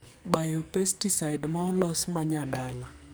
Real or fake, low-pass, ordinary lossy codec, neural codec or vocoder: fake; none; none; codec, 44.1 kHz, 2.6 kbps, SNAC